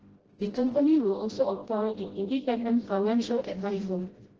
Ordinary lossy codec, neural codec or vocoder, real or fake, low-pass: Opus, 16 kbps; codec, 16 kHz, 0.5 kbps, FreqCodec, smaller model; fake; 7.2 kHz